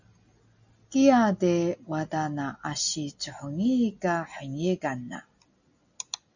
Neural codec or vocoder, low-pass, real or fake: none; 7.2 kHz; real